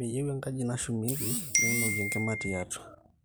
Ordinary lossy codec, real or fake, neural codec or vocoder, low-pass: none; real; none; none